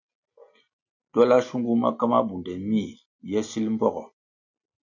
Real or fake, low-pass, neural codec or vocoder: real; 7.2 kHz; none